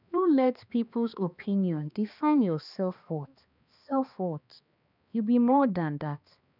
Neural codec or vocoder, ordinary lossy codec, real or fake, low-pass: codec, 16 kHz, 2 kbps, X-Codec, HuBERT features, trained on balanced general audio; none; fake; 5.4 kHz